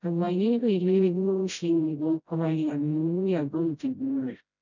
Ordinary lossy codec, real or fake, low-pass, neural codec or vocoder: none; fake; 7.2 kHz; codec, 16 kHz, 0.5 kbps, FreqCodec, smaller model